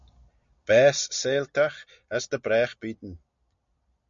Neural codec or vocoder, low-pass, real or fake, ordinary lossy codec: none; 7.2 kHz; real; MP3, 64 kbps